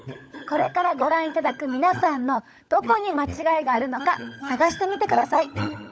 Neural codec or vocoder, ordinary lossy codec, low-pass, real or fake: codec, 16 kHz, 16 kbps, FunCodec, trained on LibriTTS, 50 frames a second; none; none; fake